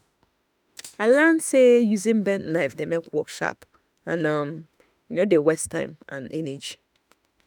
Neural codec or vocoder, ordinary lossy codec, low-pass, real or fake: autoencoder, 48 kHz, 32 numbers a frame, DAC-VAE, trained on Japanese speech; none; none; fake